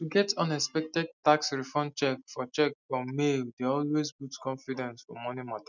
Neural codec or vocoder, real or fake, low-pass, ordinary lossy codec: none; real; 7.2 kHz; none